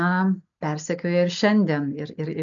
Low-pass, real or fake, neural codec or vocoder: 7.2 kHz; real; none